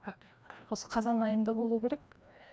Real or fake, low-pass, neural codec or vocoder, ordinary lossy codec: fake; none; codec, 16 kHz, 1 kbps, FreqCodec, larger model; none